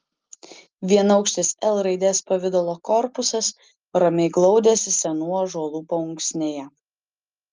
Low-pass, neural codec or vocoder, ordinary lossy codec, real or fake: 7.2 kHz; none; Opus, 24 kbps; real